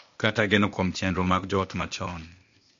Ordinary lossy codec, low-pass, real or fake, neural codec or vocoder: MP3, 48 kbps; 7.2 kHz; fake; codec, 16 kHz, 0.8 kbps, ZipCodec